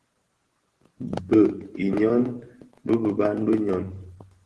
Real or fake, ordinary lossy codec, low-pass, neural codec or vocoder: fake; Opus, 16 kbps; 10.8 kHz; vocoder, 24 kHz, 100 mel bands, Vocos